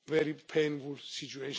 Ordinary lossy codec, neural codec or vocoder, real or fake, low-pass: none; none; real; none